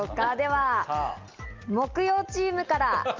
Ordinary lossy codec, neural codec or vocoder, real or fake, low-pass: Opus, 24 kbps; none; real; 7.2 kHz